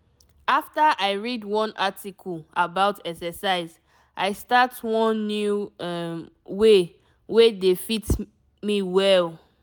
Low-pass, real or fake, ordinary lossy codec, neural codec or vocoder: none; real; none; none